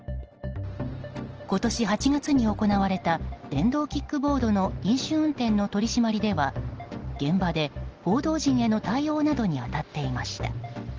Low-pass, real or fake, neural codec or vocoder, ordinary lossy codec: 7.2 kHz; real; none; Opus, 16 kbps